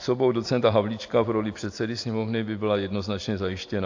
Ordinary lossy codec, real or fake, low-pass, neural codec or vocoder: AAC, 48 kbps; real; 7.2 kHz; none